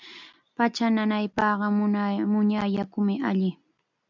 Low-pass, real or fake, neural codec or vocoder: 7.2 kHz; real; none